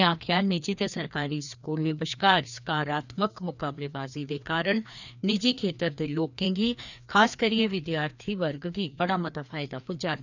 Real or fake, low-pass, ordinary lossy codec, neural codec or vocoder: fake; 7.2 kHz; none; codec, 16 kHz, 2 kbps, FreqCodec, larger model